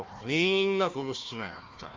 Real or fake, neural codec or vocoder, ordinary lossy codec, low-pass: fake; codec, 16 kHz, 1 kbps, FunCodec, trained on Chinese and English, 50 frames a second; Opus, 32 kbps; 7.2 kHz